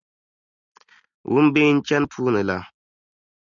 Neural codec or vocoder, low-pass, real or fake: none; 7.2 kHz; real